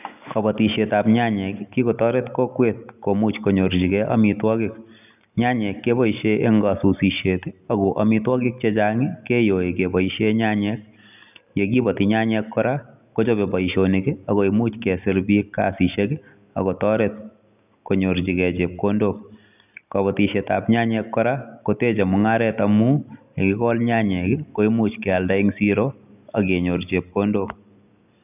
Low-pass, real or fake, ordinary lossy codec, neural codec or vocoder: 3.6 kHz; real; none; none